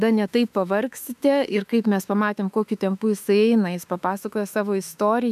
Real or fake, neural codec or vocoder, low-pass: fake; autoencoder, 48 kHz, 32 numbers a frame, DAC-VAE, trained on Japanese speech; 14.4 kHz